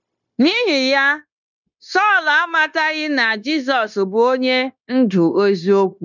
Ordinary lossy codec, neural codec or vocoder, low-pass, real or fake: none; codec, 16 kHz, 0.9 kbps, LongCat-Audio-Codec; 7.2 kHz; fake